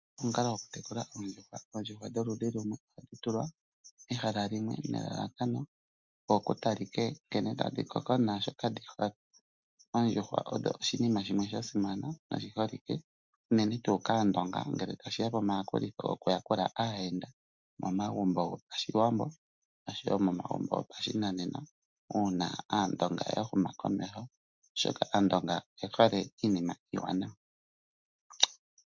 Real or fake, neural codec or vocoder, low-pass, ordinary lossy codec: real; none; 7.2 kHz; MP3, 64 kbps